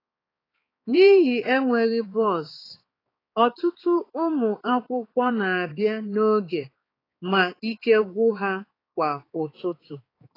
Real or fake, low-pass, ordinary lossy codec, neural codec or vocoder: fake; 5.4 kHz; AAC, 24 kbps; codec, 16 kHz, 4 kbps, X-Codec, HuBERT features, trained on balanced general audio